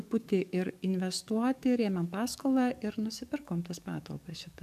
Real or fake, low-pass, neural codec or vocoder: fake; 14.4 kHz; codec, 44.1 kHz, 7.8 kbps, DAC